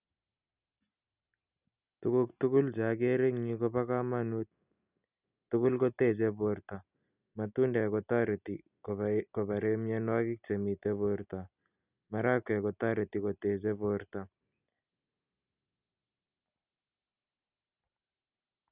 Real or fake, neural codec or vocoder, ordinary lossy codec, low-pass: real; none; none; 3.6 kHz